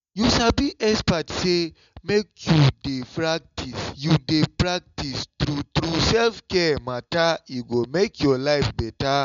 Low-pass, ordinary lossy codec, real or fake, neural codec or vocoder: 7.2 kHz; MP3, 64 kbps; real; none